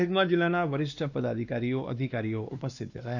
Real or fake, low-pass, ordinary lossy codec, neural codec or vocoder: fake; 7.2 kHz; none; codec, 16 kHz, 2 kbps, X-Codec, WavLM features, trained on Multilingual LibriSpeech